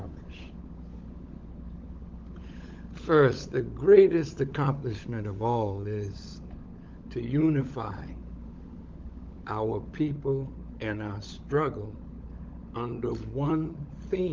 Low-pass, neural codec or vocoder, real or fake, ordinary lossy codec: 7.2 kHz; codec, 16 kHz, 16 kbps, FunCodec, trained on LibriTTS, 50 frames a second; fake; Opus, 24 kbps